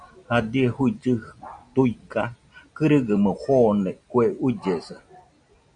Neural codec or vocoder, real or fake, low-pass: none; real; 9.9 kHz